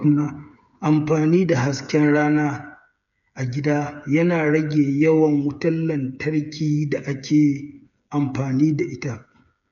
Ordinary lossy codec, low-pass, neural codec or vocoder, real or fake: none; 7.2 kHz; codec, 16 kHz, 8 kbps, FreqCodec, smaller model; fake